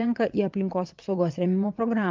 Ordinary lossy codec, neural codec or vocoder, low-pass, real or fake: Opus, 32 kbps; vocoder, 22.05 kHz, 80 mel bands, WaveNeXt; 7.2 kHz; fake